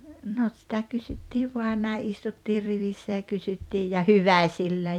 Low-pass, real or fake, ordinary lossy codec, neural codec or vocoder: 19.8 kHz; fake; none; vocoder, 44.1 kHz, 128 mel bands every 256 samples, BigVGAN v2